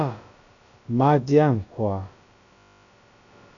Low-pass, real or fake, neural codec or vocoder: 7.2 kHz; fake; codec, 16 kHz, about 1 kbps, DyCAST, with the encoder's durations